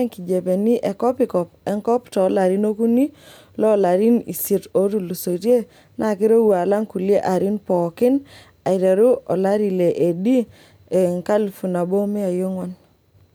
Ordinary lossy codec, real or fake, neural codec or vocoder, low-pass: none; real; none; none